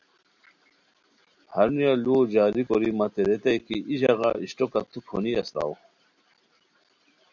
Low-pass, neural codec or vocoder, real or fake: 7.2 kHz; none; real